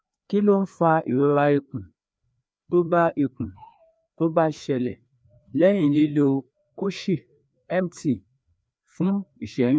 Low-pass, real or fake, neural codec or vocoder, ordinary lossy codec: none; fake; codec, 16 kHz, 2 kbps, FreqCodec, larger model; none